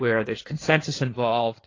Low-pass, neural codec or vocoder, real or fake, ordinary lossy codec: 7.2 kHz; codec, 16 kHz in and 24 kHz out, 1.1 kbps, FireRedTTS-2 codec; fake; AAC, 32 kbps